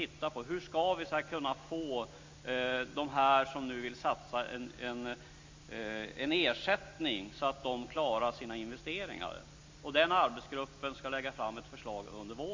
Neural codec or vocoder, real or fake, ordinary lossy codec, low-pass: none; real; MP3, 48 kbps; 7.2 kHz